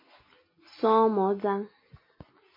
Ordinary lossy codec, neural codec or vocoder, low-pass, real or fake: MP3, 24 kbps; none; 5.4 kHz; real